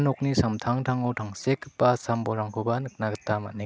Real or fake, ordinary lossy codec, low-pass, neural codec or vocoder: real; none; none; none